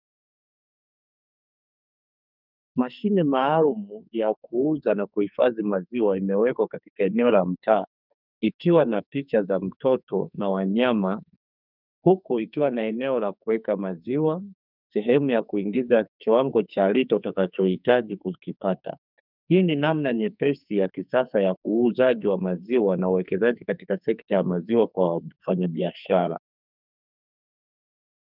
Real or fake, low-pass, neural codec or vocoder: fake; 5.4 kHz; codec, 44.1 kHz, 2.6 kbps, SNAC